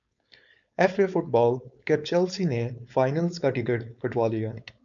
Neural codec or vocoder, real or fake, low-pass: codec, 16 kHz, 4.8 kbps, FACodec; fake; 7.2 kHz